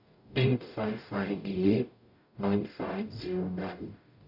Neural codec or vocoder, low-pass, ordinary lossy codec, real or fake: codec, 44.1 kHz, 0.9 kbps, DAC; 5.4 kHz; none; fake